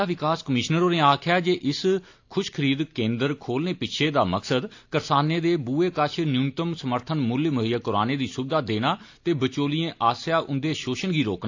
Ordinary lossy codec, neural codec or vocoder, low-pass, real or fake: AAC, 48 kbps; none; 7.2 kHz; real